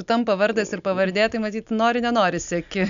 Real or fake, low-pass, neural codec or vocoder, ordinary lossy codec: real; 7.2 kHz; none; MP3, 96 kbps